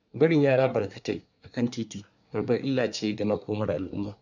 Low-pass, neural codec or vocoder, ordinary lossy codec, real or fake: 7.2 kHz; codec, 24 kHz, 1 kbps, SNAC; none; fake